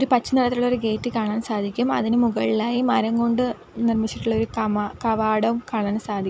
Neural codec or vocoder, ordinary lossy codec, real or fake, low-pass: none; none; real; none